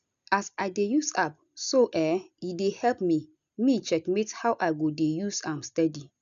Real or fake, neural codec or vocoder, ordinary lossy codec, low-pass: real; none; none; 7.2 kHz